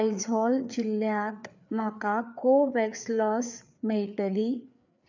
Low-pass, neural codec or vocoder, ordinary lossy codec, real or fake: 7.2 kHz; codec, 16 kHz, 4 kbps, FreqCodec, larger model; none; fake